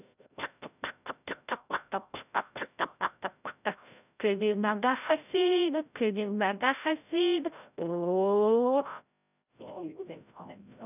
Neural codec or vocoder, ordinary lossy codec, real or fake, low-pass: codec, 16 kHz, 0.5 kbps, FreqCodec, larger model; none; fake; 3.6 kHz